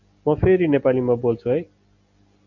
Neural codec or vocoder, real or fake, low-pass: none; real; 7.2 kHz